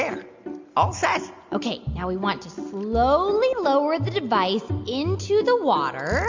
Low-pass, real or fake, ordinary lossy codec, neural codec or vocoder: 7.2 kHz; real; MP3, 64 kbps; none